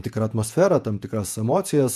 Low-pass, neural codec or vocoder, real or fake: 14.4 kHz; vocoder, 48 kHz, 128 mel bands, Vocos; fake